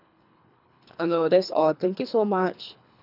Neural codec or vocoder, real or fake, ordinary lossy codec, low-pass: codec, 24 kHz, 3 kbps, HILCodec; fake; none; 5.4 kHz